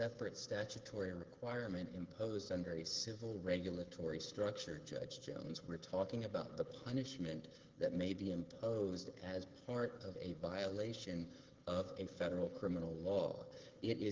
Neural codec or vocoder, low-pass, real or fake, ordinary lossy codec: codec, 16 kHz, 8 kbps, FreqCodec, smaller model; 7.2 kHz; fake; Opus, 16 kbps